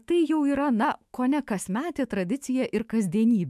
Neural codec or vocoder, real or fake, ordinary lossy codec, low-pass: autoencoder, 48 kHz, 128 numbers a frame, DAC-VAE, trained on Japanese speech; fake; MP3, 96 kbps; 14.4 kHz